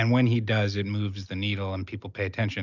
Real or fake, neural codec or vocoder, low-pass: real; none; 7.2 kHz